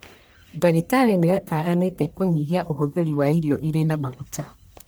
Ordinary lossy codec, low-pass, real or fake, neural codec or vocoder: none; none; fake; codec, 44.1 kHz, 1.7 kbps, Pupu-Codec